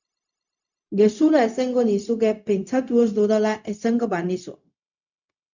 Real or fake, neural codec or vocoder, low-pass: fake; codec, 16 kHz, 0.4 kbps, LongCat-Audio-Codec; 7.2 kHz